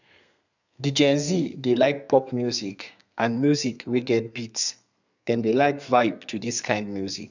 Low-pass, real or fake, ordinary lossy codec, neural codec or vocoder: 7.2 kHz; fake; none; codec, 32 kHz, 1.9 kbps, SNAC